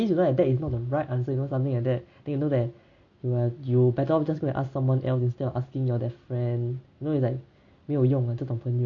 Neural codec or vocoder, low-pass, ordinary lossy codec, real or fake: none; 7.2 kHz; AAC, 48 kbps; real